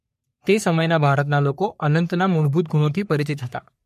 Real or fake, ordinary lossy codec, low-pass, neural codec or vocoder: fake; MP3, 64 kbps; 14.4 kHz; codec, 44.1 kHz, 3.4 kbps, Pupu-Codec